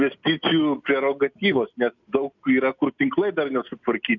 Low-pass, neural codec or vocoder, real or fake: 7.2 kHz; none; real